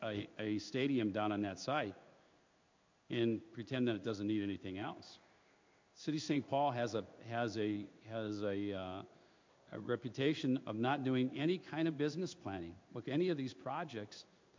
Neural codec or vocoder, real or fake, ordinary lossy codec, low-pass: codec, 16 kHz in and 24 kHz out, 1 kbps, XY-Tokenizer; fake; MP3, 48 kbps; 7.2 kHz